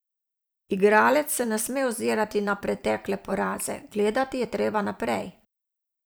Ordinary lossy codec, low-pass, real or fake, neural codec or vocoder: none; none; fake; vocoder, 44.1 kHz, 128 mel bands every 256 samples, BigVGAN v2